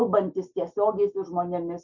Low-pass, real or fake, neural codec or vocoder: 7.2 kHz; real; none